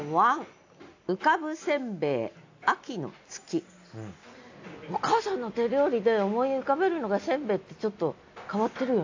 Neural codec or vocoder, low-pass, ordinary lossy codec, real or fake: none; 7.2 kHz; AAC, 48 kbps; real